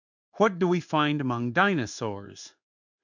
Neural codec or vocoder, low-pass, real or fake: codec, 16 kHz in and 24 kHz out, 1 kbps, XY-Tokenizer; 7.2 kHz; fake